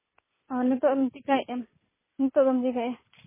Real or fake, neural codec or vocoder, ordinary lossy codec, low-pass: real; none; MP3, 16 kbps; 3.6 kHz